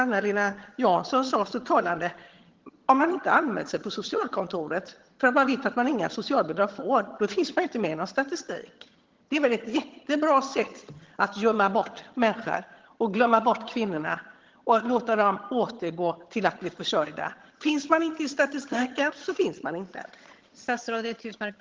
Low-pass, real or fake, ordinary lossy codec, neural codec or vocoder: 7.2 kHz; fake; Opus, 16 kbps; vocoder, 22.05 kHz, 80 mel bands, HiFi-GAN